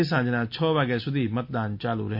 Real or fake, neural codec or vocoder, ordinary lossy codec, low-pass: real; none; MP3, 32 kbps; 5.4 kHz